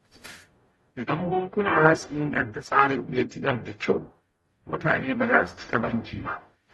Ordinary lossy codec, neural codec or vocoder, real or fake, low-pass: AAC, 32 kbps; codec, 44.1 kHz, 0.9 kbps, DAC; fake; 19.8 kHz